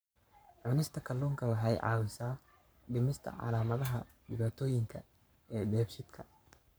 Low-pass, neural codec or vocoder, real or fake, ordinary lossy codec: none; codec, 44.1 kHz, 7.8 kbps, Pupu-Codec; fake; none